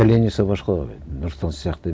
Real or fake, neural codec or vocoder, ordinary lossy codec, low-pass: real; none; none; none